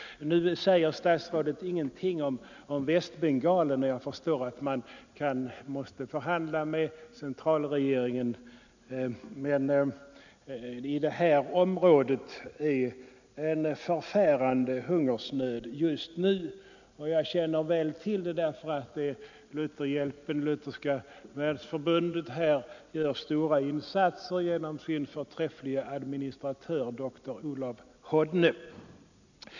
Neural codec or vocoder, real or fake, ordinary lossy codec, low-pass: none; real; none; 7.2 kHz